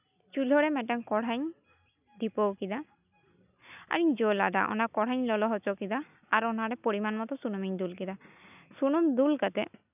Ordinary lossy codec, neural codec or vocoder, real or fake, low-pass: none; none; real; 3.6 kHz